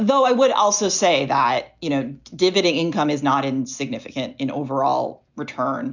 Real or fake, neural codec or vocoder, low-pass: real; none; 7.2 kHz